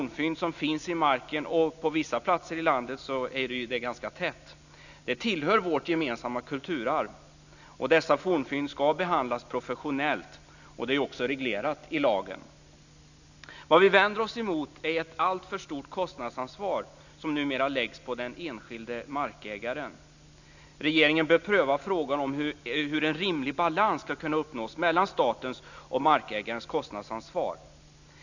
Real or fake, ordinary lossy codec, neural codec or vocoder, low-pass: real; none; none; 7.2 kHz